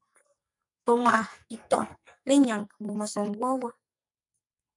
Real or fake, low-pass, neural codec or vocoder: fake; 10.8 kHz; codec, 44.1 kHz, 2.6 kbps, SNAC